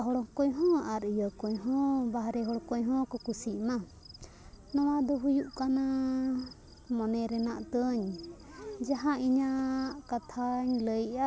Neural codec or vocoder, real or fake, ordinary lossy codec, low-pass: none; real; none; none